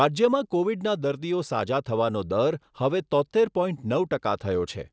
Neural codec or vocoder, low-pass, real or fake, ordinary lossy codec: none; none; real; none